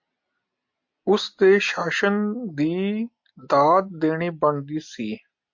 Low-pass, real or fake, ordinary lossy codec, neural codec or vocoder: 7.2 kHz; real; MP3, 48 kbps; none